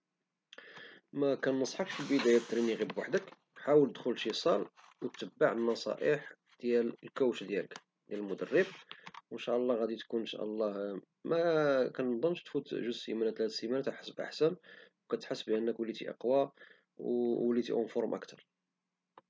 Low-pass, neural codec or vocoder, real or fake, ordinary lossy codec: none; none; real; none